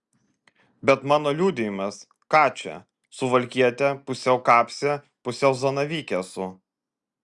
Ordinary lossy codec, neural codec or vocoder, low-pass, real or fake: Opus, 64 kbps; none; 10.8 kHz; real